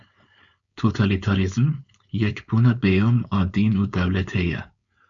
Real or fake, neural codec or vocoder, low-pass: fake; codec, 16 kHz, 4.8 kbps, FACodec; 7.2 kHz